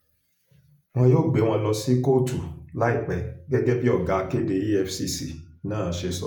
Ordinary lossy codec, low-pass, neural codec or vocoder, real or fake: none; none; vocoder, 48 kHz, 128 mel bands, Vocos; fake